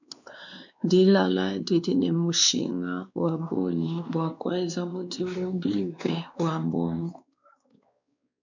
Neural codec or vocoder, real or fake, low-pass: codec, 16 kHz, 2 kbps, X-Codec, WavLM features, trained on Multilingual LibriSpeech; fake; 7.2 kHz